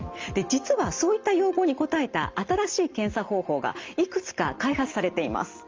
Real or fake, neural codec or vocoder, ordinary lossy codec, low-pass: real; none; Opus, 32 kbps; 7.2 kHz